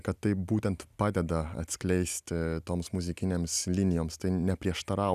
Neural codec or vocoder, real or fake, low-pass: none; real; 14.4 kHz